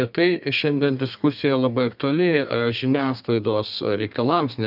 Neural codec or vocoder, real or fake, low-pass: codec, 32 kHz, 1.9 kbps, SNAC; fake; 5.4 kHz